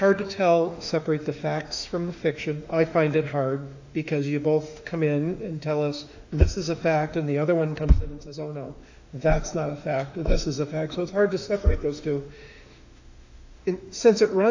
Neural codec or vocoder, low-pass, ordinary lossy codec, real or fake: autoencoder, 48 kHz, 32 numbers a frame, DAC-VAE, trained on Japanese speech; 7.2 kHz; AAC, 48 kbps; fake